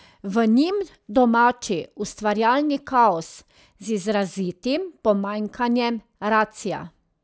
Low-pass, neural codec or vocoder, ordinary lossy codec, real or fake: none; none; none; real